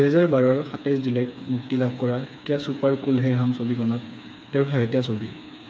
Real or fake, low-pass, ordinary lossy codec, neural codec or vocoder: fake; none; none; codec, 16 kHz, 4 kbps, FreqCodec, smaller model